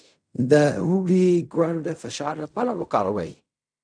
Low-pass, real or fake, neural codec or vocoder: 9.9 kHz; fake; codec, 16 kHz in and 24 kHz out, 0.4 kbps, LongCat-Audio-Codec, fine tuned four codebook decoder